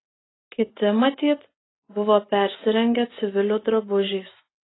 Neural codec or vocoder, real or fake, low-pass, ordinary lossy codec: none; real; 7.2 kHz; AAC, 16 kbps